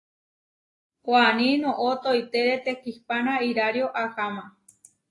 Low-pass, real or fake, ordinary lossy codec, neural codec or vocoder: 10.8 kHz; real; AAC, 32 kbps; none